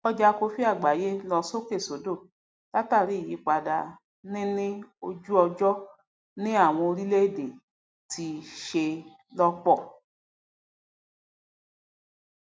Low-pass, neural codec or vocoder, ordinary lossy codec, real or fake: none; none; none; real